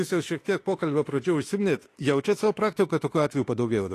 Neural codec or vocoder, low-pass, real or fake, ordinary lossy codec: autoencoder, 48 kHz, 32 numbers a frame, DAC-VAE, trained on Japanese speech; 14.4 kHz; fake; AAC, 48 kbps